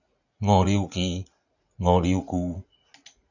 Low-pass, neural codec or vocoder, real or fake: 7.2 kHz; vocoder, 44.1 kHz, 80 mel bands, Vocos; fake